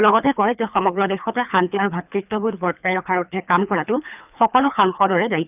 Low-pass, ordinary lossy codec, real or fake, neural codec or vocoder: 3.6 kHz; none; fake; codec, 24 kHz, 3 kbps, HILCodec